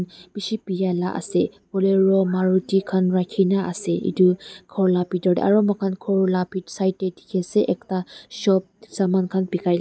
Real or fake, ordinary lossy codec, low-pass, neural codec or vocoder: real; none; none; none